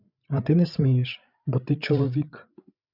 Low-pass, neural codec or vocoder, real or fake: 5.4 kHz; codec, 16 kHz, 8 kbps, FreqCodec, larger model; fake